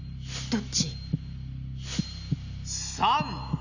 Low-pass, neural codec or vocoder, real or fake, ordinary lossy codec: 7.2 kHz; none; real; none